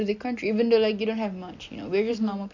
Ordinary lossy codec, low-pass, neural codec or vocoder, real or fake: none; 7.2 kHz; none; real